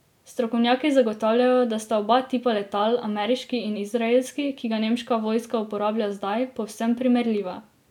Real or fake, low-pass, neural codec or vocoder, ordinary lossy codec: real; 19.8 kHz; none; none